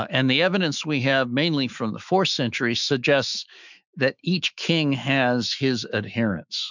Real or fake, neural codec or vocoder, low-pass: fake; autoencoder, 48 kHz, 128 numbers a frame, DAC-VAE, trained on Japanese speech; 7.2 kHz